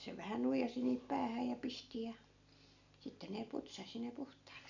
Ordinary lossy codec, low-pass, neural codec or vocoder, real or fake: none; 7.2 kHz; none; real